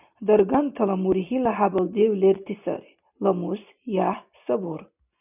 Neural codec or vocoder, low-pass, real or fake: none; 3.6 kHz; real